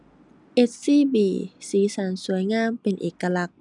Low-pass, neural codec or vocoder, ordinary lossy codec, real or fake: 10.8 kHz; none; none; real